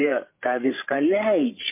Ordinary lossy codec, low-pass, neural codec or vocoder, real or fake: MP3, 24 kbps; 3.6 kHz; codec, 16 kHz, 16 kbps, FreqCodec, larger model; fake